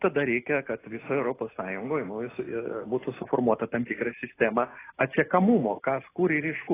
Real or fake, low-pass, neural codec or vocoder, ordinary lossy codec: real; 3.6 kHz; none; AAC, 16 kbps